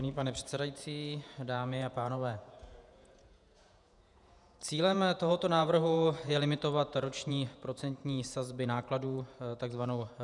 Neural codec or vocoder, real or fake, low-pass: vocoder, 48 kHz, 128 mel bands, Vocos; fake; 10.8 kHz